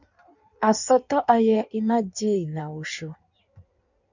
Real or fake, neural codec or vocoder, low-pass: fake; codec, 16 kHz in and 24 kHz out, 1.1 kbps, FireRedTTS-2 codec; 7.2 kHz